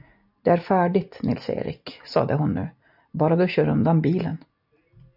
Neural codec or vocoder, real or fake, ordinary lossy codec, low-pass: none; real; AAC, 48 kbps; 5.4 kHz